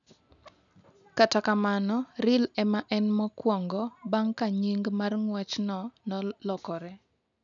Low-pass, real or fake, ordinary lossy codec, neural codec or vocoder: 7.2 kHz; real; none; none